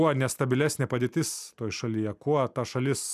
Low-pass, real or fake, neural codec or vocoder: 14.4 kHz; fake; vocoder, 44.1 kHz, 128 mel bands every 256 samples, BigVGAN v2